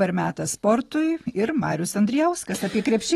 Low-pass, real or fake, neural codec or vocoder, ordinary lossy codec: 19.8 kHz; fake; vocoder, 44.1 kHz, 128 mel bands every 256 samples, BigVGAN v2; AAC, 32 kbps